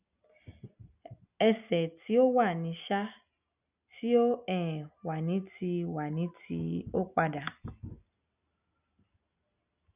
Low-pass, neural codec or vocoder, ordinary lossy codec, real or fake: 3.6 kHz; none; none; real